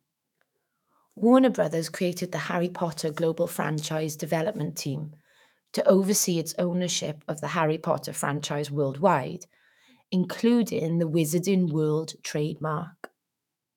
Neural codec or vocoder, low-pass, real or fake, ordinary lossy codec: autoencoder, 48 kHz, 128 numbers a frame, DAC-VAE, trained on Japanese speech; 19.8 kHz; fake; none